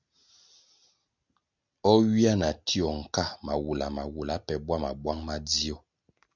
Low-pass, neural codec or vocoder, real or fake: 7.2 kHz; none; real